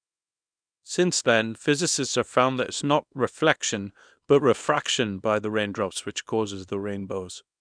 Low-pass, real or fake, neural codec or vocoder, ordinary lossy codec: 9.9 kHz; fake; codec, 24 kHz, 0.9 kbps, WavTokenizer, small release; none